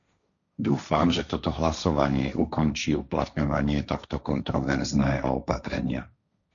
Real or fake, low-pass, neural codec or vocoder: fake; 7.2 kHz; codec, 16 kHz, 1.1 kbps, Voila-Tokenizer